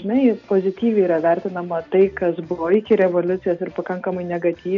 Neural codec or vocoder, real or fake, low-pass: none; real; 7.2 kHz